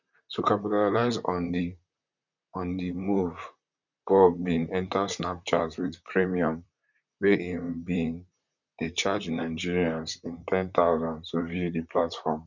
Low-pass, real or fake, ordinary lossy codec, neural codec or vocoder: 7.2 kHz; fake; none; vocoder, 44.1 kHz, 128 mel bands, Pupu-Vocoder